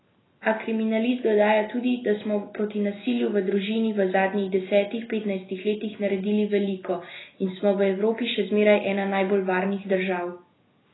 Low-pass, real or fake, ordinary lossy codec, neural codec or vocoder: 7.2 kHz; real; AAC, 16 kbps; none